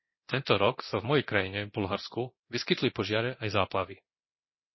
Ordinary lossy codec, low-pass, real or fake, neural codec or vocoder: MP3, 24 kbps; 7.2 kHz; fake; codec, 24 kHz, 0.9 kbps, DualCodec